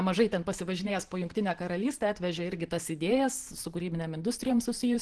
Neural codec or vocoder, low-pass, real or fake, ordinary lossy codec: vocoder, 44.1 kHz, 128 mel bands every 512 samples, BigVGAN v2; 10.8 kHz; fake; Opus, 16 kbps